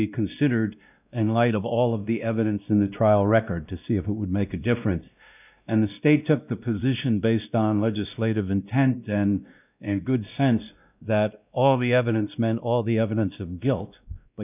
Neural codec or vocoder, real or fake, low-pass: codec, 16 kHz, 1 kbps, X-Codec, WavLM features, trained on Multilingual LibriSpeech; fake; 3.6 kHz